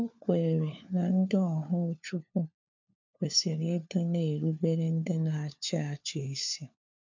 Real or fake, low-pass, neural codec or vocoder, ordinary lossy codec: fake; 7.2 kHz; codec, 16 kHz, 4 kbps, FunCodec, trained on LibriTTS, 50 frames a second; MP3, 64 kbps